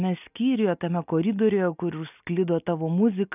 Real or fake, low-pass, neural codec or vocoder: real; 3.6 kHz; none